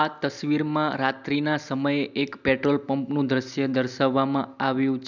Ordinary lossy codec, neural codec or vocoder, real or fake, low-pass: none; none; real; 7.2 kHz